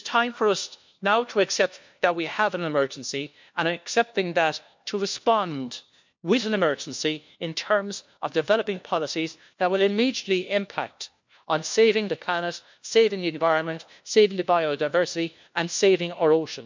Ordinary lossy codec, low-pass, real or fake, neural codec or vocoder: MP3, 64 kbps; 7.2 kHz; fake; codec, 16 kHz, 1 kbps, FunCodec, trained on LibriTTS, 50 frames a second